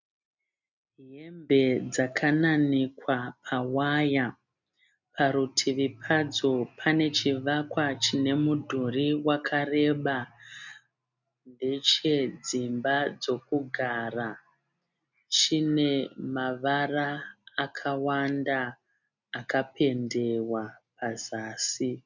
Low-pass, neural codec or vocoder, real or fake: 7.2 kHz; none; real